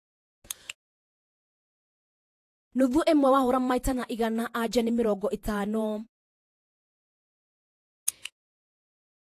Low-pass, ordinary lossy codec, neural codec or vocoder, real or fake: 14.4 kHz; AAC, 64 kbps; vocoder, 48 kHz, 128 mel bands, Vocos; fake